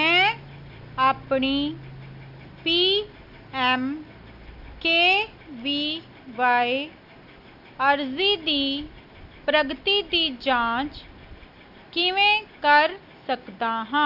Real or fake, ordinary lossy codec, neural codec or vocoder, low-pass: real; none; none; 5.4 kHz